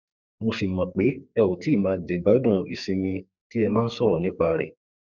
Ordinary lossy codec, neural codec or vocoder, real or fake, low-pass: none; codec, 44.1 kHz, 2.6 kbps, SNAC; fake; 7.2 kHz